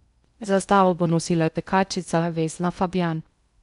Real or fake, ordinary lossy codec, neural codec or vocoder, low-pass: fake; none; codec, 16 kHz in and 24 kHz out, 0.6 kbps, FocalCodec, streaming, 2048 codes; 10.8 kHz